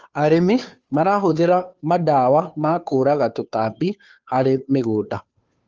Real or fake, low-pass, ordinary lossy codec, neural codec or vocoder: fake; 7.2 kHz; Opus, 32 kbps; codec, 16 kHz, 2 kbps, FunCodec, trained on Chinese and English, 25 frames a second